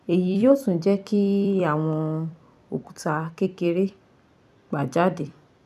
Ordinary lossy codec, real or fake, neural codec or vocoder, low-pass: none; fake; vocoder, 44.1 kHz, 128 mel bands every 256 samples, BigVGAN v2; 14.4 kHz